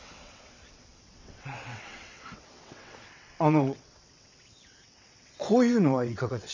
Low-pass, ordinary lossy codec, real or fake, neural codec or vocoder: 7.2 kHz; MP3, 64 kbps; fake; vocoder, 22.05 kHz, 80 mel bands, WaveNeXt